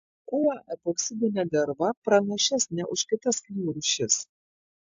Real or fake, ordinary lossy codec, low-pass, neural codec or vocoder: real; AAC, 64 kbps; 7.2 kHz; none